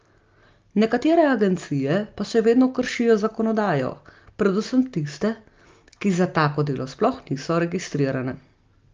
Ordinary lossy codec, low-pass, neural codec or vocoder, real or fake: Opus, 24 kbps; 7.2 kHz; none; real